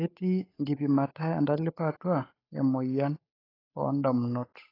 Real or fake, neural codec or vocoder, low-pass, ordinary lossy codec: fake; codec, 16 kHz, 8 kbps, FunCodec, trained on Chinese and English, 25 frames a second; 5.4 kHz; AAC, 24 kbps